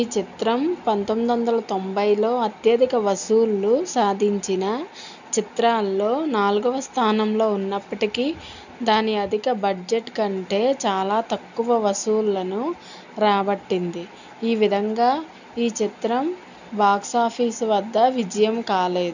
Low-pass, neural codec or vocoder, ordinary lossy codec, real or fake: 7.2 kHz; none; none; real